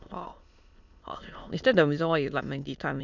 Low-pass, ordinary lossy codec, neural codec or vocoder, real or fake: 7.2 kHz; none; autoencoder, 22.05 kHz, a latent of 192 numbers a frame, VITS, trained on many speakers; fake